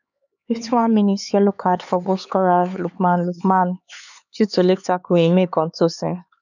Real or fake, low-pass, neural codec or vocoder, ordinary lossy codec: fake; 7.2 kHz; codec, 16 kHz, 4 kbps, X-Codec, HuBERT features, trained on LibriSpeech; none